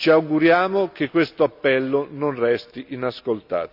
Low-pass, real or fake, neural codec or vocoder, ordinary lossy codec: 5.4 kHz; real; none; none